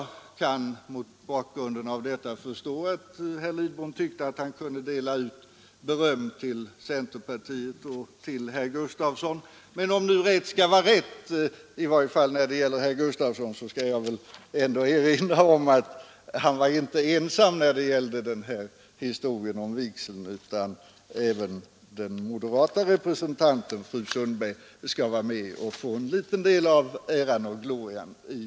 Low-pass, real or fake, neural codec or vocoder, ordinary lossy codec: none; real; none; none